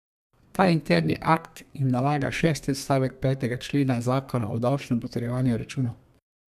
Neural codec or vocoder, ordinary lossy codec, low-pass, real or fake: codec, 32 kHz, 1.9 kbps, SNAC; none; 14.4 kHz; fake